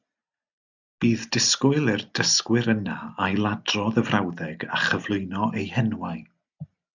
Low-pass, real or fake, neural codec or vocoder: 7.2 kHz; real; none